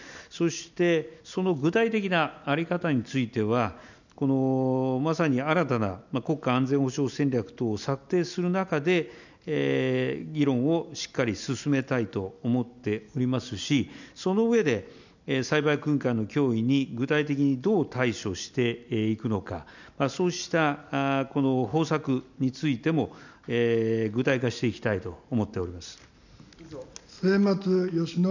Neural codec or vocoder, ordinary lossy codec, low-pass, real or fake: none; none; 7.2 kHz; real